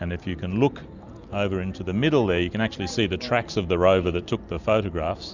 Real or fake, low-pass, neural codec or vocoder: real; 7.2 kHz; none